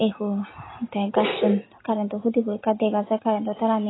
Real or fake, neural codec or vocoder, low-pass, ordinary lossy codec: real; none; 7.2 kHz; AAC, 16 kbps